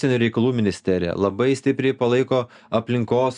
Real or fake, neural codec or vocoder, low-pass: real; none; 9.9 kHz